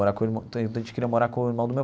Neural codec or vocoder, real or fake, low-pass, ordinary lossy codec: none; real; none; none